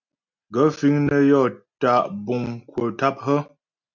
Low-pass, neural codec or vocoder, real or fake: 7.2 kHz; none; real